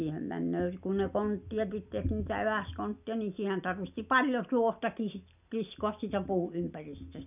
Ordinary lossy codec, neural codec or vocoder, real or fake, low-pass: none; none; real; 3.6 kHz